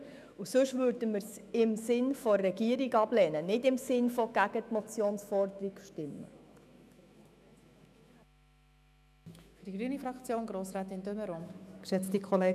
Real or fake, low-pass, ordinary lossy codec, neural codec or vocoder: fake; 14.4 kHz; none; autoencoder, 48 kHz, 128 numbers a frame, DAC-VAE, trained on Japanese speech